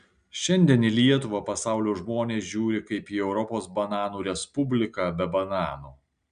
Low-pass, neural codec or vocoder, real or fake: 9.9 kHz; none; real